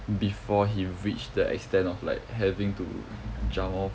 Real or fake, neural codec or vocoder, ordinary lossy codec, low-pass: real; none; none; none